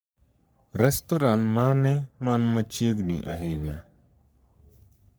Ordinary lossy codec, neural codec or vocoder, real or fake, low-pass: none; codec, 44.1 kHz, 3.4 kbps, Pupu-Codec; fake; none